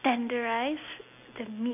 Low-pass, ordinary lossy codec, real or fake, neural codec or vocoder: 3.6 kHz; none; real; none